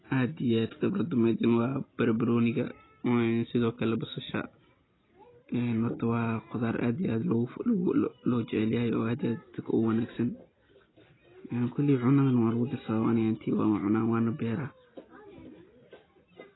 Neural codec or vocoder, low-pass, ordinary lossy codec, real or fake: none; 7.2 kHz; AAC, 16 kbps; real